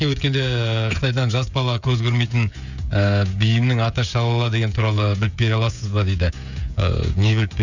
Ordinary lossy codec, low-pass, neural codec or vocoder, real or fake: none; 7.2 kHz; codec, 16 kHz, 16 kbps, FreqCodec, smaller model; fake